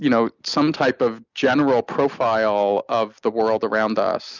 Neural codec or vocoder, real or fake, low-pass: none; real; 7.2 kHz